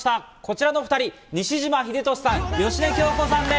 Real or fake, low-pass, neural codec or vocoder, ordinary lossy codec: real; none; none; none